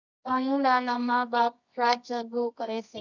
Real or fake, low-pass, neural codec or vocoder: fake; 7.2 kHz; codec, 24 kHz, 0.9 kbps, WavTokenizer, medium music audio release